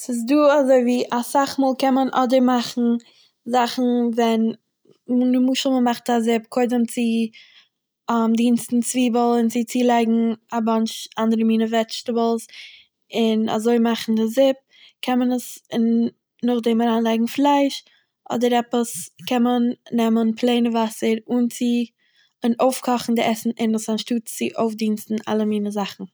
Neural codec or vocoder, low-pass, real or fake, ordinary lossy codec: none; none; real; none